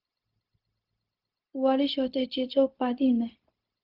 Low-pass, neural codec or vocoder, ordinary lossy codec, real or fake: 5.4 kHz; codec, 16 kHz, 0.4 kbps, LongCat-Audio-Codec; Opus, 32 kbps; fake